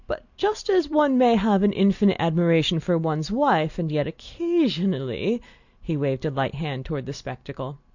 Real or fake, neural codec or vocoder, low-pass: real; none; 7.2 kHz